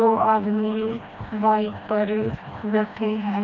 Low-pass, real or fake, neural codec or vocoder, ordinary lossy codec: 7.2 kHz; fake; codec, 16 kHz, 1 kbps, FreqCodec, smaller model; none